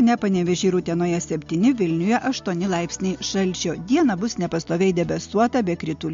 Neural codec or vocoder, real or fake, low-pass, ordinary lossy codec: none; real; 7.2 kHz; MP3, 48 kbps